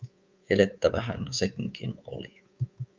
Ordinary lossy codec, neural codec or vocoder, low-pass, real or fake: Opus, 32 kbps; codec, 24 kHz, 3.1 kbps, DualCodec; 7.2 kHz; fake